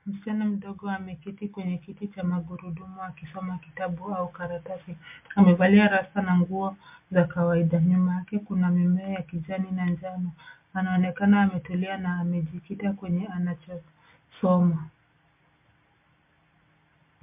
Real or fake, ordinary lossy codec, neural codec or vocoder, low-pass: real; MP3, 32 kbps; none; 3.6 kHz